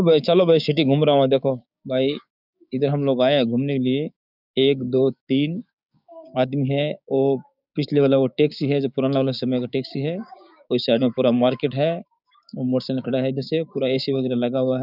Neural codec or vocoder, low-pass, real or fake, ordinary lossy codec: codec, 16 kHz, 6 kbps, DAC; 5.4 kHz; fake; none